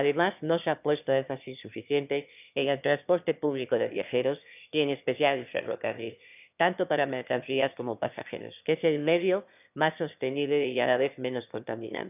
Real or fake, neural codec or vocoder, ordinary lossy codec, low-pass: fake; autoencoder, 22.05 kHz, a latent of 192 numbers a frame, VITS, trained on one speaker; none; 3.6 kHz